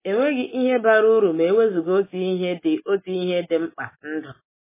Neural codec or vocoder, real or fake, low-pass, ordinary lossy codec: none; real; 3.6 kHz; MP3, 16 kbps